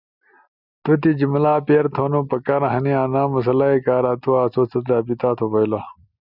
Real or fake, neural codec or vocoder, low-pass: real; none; 5.4 kHz